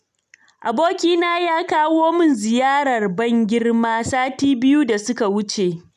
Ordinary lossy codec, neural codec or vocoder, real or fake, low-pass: none; none; real; 14.4 kHz